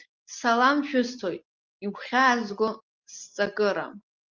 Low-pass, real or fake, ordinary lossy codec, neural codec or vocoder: 7.2 kHz; real; Opus, 24 kbps; none